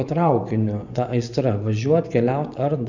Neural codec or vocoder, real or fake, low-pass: vocoder, 24 kHz, 100 mel bands, Vocos; fake; 7.2 kHz